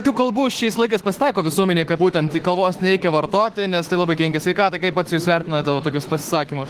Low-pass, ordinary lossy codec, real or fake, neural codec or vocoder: 14.4 kHz; Opus, 16 kbps; fake; autoencoder, 48 kHz, 32 numbers a frame, DAC-VAE, trained on Japanese speech